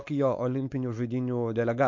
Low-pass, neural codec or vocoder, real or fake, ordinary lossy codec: 7.2 kHz; codec, 16 kHz, 4.8 kbps, FACodec; fake; MP3, 48 kbps